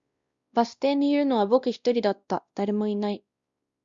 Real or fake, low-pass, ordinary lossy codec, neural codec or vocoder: fake; 7.2 kHz; Opus, 64 kbps; codec, 16 kHz, 1 kbps, X-Codec, WavLM features, trained on Multilingual LibriSpeech